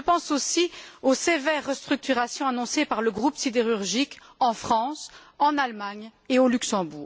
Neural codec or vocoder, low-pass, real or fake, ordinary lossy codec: none; none; real; none